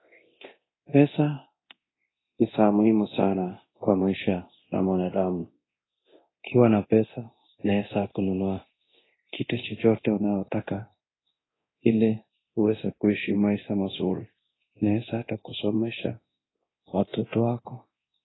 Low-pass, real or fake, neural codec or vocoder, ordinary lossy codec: 7.2 kHz; fake; codec, 24 kHz, 0.9 kbps, DualCodec; AAC, 16 kbps